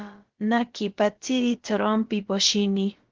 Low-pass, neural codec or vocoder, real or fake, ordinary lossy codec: 7.2 kHz; codec, 16 kHz, about 1 kbps, DyCAST, with the encoder's durations; fake; Opus, 16 kbps